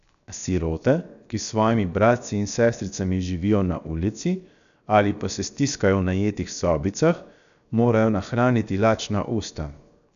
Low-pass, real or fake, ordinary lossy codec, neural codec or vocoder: 7.2 kHz; fake; none; codec, 16 kHz, 0.7 kbps, FocalCodec